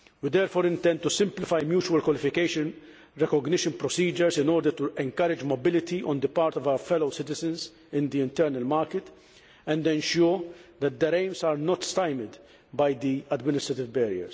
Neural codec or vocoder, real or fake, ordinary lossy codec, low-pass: none; real; none; none